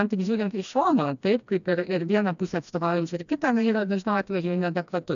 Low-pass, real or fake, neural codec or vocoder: 7.2 kHz; fake; codec, 16 kHz, 1 kbps, FreqCodec, smaller model